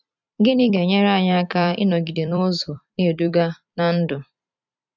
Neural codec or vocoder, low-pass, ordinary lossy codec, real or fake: vocoder, 44.1 kHz, 128 mel bands every 256 samples, BigVGAN v2; 7.2 kHz; none; fake